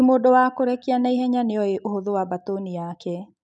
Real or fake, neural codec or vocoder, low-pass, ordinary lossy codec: real; none; 10.8 kHz; none